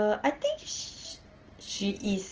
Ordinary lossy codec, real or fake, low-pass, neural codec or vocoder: Opus, 16 kbps; real; 7.2 kHz; none